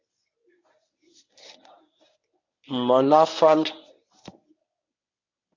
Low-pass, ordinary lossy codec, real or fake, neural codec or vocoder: 7.2 kHz; MP3, 48 kbps; fake; codec, 24 kHz, 0.9 kbps, WavTokenizer, medium speech release version 1